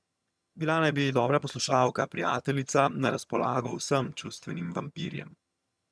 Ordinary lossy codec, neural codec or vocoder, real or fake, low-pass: none; vocoder, 22.05 kHz, 80 mel bands, HiFi-GAN; fake; none